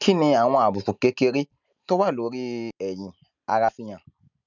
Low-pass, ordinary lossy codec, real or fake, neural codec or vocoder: 7.2 kHz; none; real; none